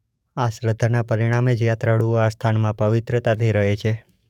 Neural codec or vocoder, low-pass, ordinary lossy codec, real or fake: codec, 44.1 kHz, 7.8 kbps, DAC; 19.8 kHz; none; fake